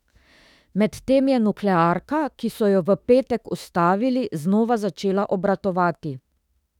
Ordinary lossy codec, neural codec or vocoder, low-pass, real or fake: none; autoencoder, 48 kHz, 32 numbers a frame, DAC-VAE, trained on Japanese speech; 19.8 kHz; fake